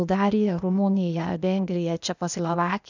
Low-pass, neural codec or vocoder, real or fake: 7.2 kHz; codec, 16 kHz, 0.8 kbps, ZipCodec; fake